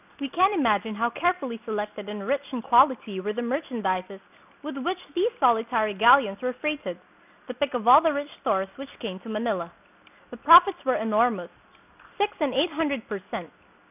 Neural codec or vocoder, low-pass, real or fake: none; 3.6 kHz; real